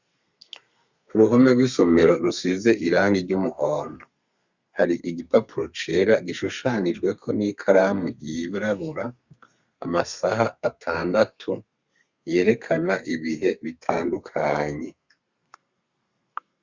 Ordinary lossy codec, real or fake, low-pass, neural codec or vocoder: Opus, 64 kbps; fake; 7.2 kHz; codec, 32 kHz, 1.9 kbps, SNAC